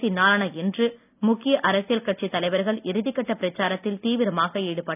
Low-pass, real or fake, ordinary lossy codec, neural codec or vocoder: 3.6 kHz; real; none; none